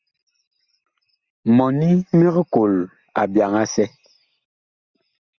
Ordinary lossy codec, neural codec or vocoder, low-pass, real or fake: Opus, 64 kbps; none; 7.2 kHz; real